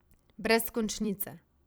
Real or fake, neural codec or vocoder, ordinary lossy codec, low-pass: fake; vocoder, 44.1 kHz, 128 mel bands every 256 samples, BigVGAN v2; none; none